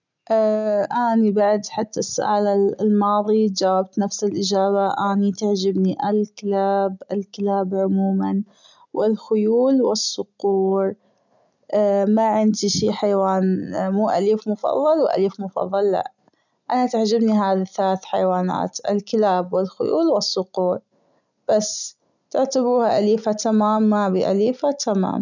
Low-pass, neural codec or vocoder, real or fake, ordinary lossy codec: 7.2 kHz; none; real; none